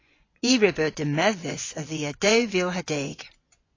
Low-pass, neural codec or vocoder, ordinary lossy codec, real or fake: 7.2 kHz; vocoder, 24 kHz, 100 mel bands, Vocos; AAC, 32 kbps; fake